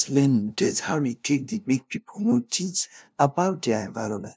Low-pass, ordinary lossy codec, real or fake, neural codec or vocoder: none; none; fake; codec, 16 kHz, 0.5 kbps, FunCodec, trained on LibriTTS, 25 frames a second